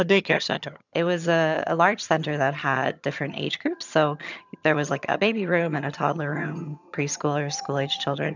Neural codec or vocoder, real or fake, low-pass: vocoder, 22.05 kHz, 80 mel bands, HiFi-GAN; fake; 7.2 kHz